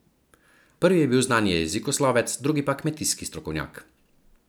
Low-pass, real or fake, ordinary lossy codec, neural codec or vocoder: none; real; none; none